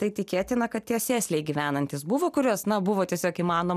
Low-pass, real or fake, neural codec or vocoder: 14.4 kHz; real; none